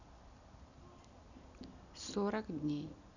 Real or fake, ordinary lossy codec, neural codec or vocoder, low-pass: real; none; none; 7.2 kHz